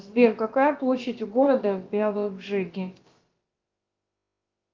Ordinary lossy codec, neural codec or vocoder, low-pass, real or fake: Opus, 24 kbps; codec, 16 kHz, about 1 kbps, DyCAST, with the encoder's durations; 7.2 kHz; fake